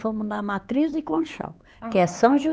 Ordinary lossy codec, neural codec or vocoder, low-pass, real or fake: none; codec, 16 kHz, 4 kbps, X-Codec, HuBERT features, trained on LibriSpeech; none; fake